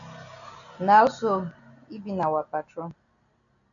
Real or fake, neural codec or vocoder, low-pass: real; none; 7.2 kHz